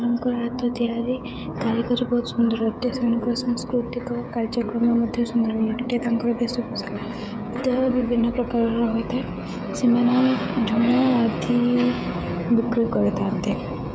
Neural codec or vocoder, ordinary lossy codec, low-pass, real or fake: codec, 16 kHz, 16 kbps, FreqCodec, smaller model; none; none; fake